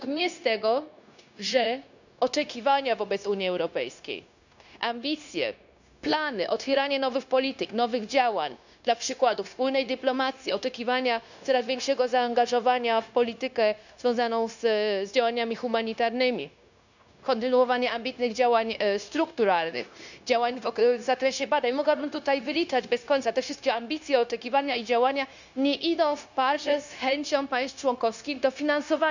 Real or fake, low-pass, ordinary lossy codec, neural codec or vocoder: fake; 7.2 kHz; none; codec, 16 kHz, 0.9 kbps, LongCat-Audio-Codec